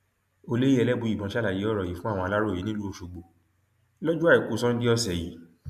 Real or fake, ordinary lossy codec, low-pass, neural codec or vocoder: real; MP3, 96 kbps; 14.4 kHz; none